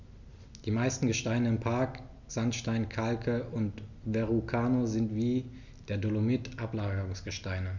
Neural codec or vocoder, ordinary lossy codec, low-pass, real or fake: none; none; 7.2 kHz; real